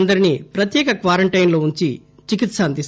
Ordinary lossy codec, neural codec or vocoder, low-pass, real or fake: none; none; none; real